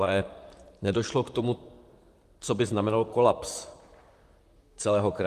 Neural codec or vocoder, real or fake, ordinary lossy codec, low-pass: vocoder, 44.1 kHz, 128 mel bands, Pupu-Vocoder; fake; Opus, 32 kbps; 14.4 kHz